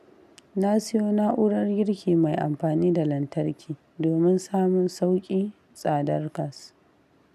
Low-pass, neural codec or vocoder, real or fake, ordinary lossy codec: 14.4 kHz; none; real; none